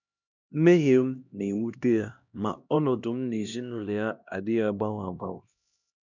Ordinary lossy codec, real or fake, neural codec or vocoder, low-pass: none; fake; codec, 16 kHz, 1 kbps, X-Codec, HuBERT features, trained on LibriSpeech; 7.2 kHz